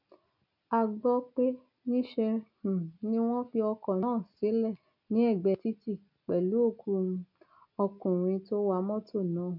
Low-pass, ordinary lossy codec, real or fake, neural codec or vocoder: 5.4 kHz; none; real; none